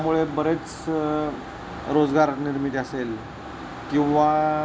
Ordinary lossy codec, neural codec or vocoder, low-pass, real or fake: none; none; none; real